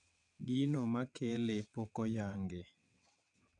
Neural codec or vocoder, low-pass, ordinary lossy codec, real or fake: vocoder, 22.05 kHz, 80 mel bands, WaveNeXt; 9.9 kHz; none; fake